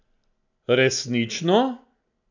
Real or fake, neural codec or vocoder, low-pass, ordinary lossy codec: real; none; 7.2 kHz; none